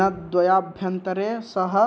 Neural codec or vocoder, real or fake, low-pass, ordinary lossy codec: none; real; none; none